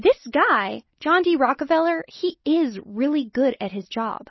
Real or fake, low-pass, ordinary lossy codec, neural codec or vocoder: real; 7.2 kHz; MP3, 24 kbps; none